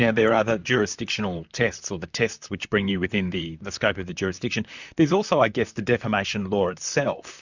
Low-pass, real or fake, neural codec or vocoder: 7.2 kHz; fake; vocoder, 44.1 kHz, 128 mel bands, Pupu-Vocoder